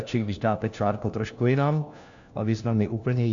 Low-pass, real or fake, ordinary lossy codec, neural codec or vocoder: 7.2 kHz; fake; AAC, 64 kbps; codec, 16 kHz, 1 kbps, FunCodec, trained on LibriTTS, 50 frames a second